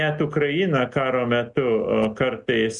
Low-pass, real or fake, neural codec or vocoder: 10.8 kHz; real; none